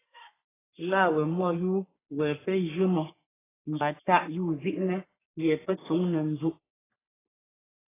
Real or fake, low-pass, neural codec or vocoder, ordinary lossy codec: fake; 3.6 kHz; codec, 44.1 kHz, 3.4 kbps, Pupu-Codec; AAC, 16 kbps